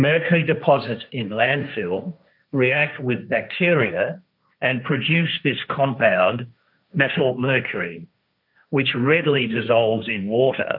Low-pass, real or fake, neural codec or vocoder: 5.4 kHz; fake; codec, 16 kHz, 1.1 kbps, Voila-Tokenizer